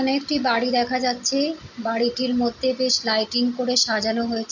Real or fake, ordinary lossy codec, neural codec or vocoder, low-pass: real; none; none; 7.2 kHz